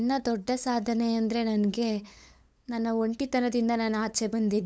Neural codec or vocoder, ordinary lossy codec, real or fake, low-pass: codec, 16 kHz, 8 kbps, FunCodec, trained on LibriTTS, 25 frames a second; none; fake; none